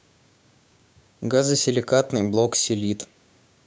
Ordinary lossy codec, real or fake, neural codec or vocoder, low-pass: none; fake; codec, 16 kHz, 6 kbps, DAC; none